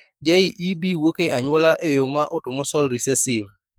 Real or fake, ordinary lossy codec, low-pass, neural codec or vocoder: fake; none; none; codec, 44.1 kHz, 2.6 kbps, SNAC